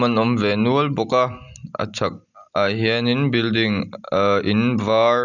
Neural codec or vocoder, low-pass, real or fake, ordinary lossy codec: none; 7.2 kHz; real; none